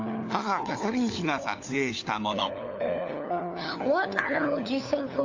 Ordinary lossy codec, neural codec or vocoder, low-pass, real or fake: AAC, 48 kbps; codec, 16 kHz, 4 kbps, FunCodec, trained on LibriTTS, 50 frames a second; 7.2 kHz; fake